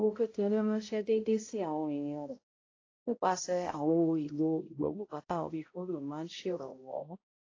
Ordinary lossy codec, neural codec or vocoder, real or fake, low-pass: AAC, 32 kbps; codec, 16 kHz, 0.5 kbps, X-Codec, HuBERT features, trained on balanced general audio; fake; 7.2 kHz